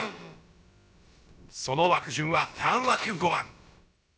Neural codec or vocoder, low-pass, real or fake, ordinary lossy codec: codec, 16 kHz, about 1 kbps, DyCAST, with the encoder's durations; none; fake; none